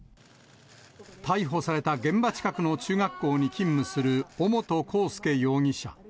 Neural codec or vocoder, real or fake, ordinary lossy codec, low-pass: none; real; none; none